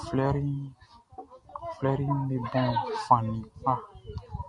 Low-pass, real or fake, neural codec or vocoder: 10.8 kHz; real; none